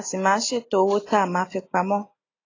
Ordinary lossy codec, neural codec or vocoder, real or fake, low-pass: AAC, 32 kbps; none; real; 7.2 kHz